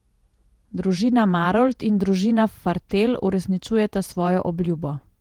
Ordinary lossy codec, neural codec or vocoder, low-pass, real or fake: Opus, 24 kbps; vocoder, 48 kHz, 128 mel bands, Vocos; 19.8 kHz; fake